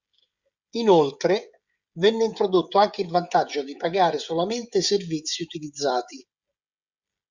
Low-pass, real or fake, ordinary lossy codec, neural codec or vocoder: 7.2 kHz; fake; Opus, 64 kbps; codec, 16 kHz, 16 kbps, FreqCodec, smaller model